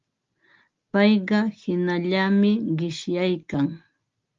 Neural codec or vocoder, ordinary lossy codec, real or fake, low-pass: none; Opus, 32 kbps; real; 7.2 kHz